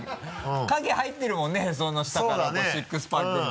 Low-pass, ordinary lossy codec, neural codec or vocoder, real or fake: none; none; none; real